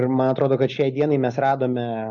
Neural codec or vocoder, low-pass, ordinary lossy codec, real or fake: none; 7.2 kHz; MP3, 96 kbps; real